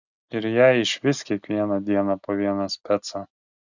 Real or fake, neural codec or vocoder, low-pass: real; none; 7.2 kHz